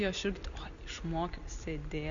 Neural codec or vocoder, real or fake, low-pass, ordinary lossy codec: none; real; 7.2 kHz; MP3, 96 kbps